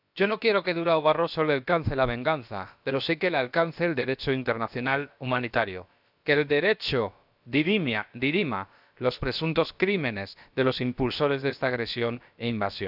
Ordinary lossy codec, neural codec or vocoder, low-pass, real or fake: none; codec, 16 kHz, about 1 kbps, DyCAST, with the encoder's durations; 5.4 kHz; fake